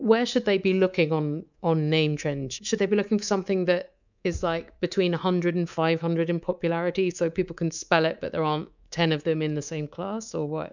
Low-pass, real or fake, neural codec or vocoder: 7.2 kHz; fake; codec, 24 kHz, 3.1 kbps, DualCodec